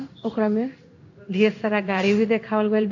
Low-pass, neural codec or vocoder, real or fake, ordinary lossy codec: 7.2 kHz; codec, 16 kHz in and 24 kHz out, 1 kbps, XY-Tokenizer; fake; none